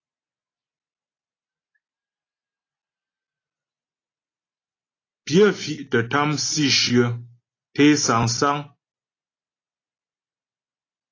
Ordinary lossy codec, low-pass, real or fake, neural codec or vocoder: AAC, 32 kbps; 7.2 kHz; real; none